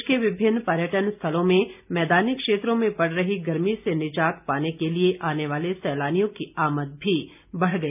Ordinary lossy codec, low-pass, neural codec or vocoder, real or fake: none; 3.6 kHz; none; real